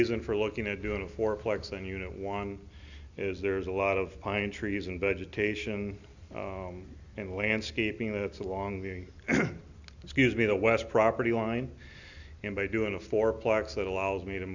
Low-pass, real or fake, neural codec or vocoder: 7.2 kHz; real; none